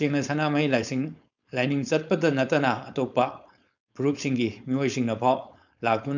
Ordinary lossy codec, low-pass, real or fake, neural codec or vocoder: none; 7.2 kHz; fake; codec, 16 kHz, 4.8 kbps, FACodec